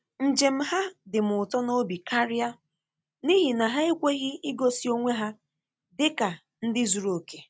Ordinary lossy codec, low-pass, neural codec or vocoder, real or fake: none; none; none; real